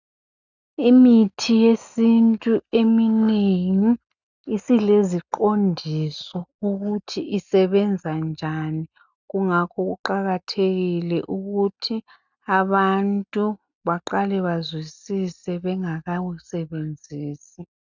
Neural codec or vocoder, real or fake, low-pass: none; real; 7.2 kHz